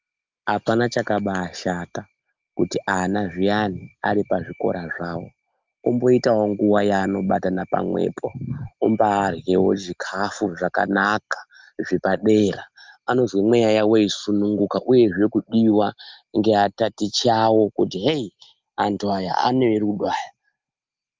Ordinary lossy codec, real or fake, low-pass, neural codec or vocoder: Opus, 24 kbps; real; 7.2 kHz; none